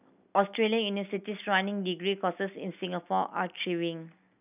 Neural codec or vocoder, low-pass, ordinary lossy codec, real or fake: none; 3.6 kHz; none; real